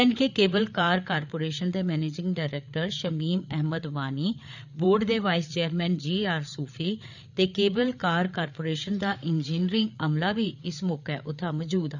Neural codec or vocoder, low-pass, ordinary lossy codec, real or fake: codec, 16 kHz, 8 kbps, FreqCodec, larger model; 7.2 kHz; none; fake